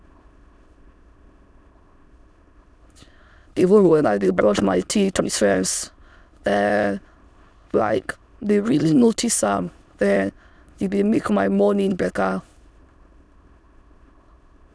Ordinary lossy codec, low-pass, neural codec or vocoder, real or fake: none; none; autoencoder, 22.05 kHz, a latent of 192 numbers a frame, VITS, trained on many speakers; fake